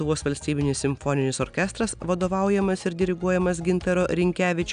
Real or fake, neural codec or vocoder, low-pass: real; none; 9.9 kHz